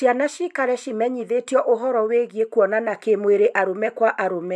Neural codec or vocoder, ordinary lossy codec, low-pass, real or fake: none; none; none; real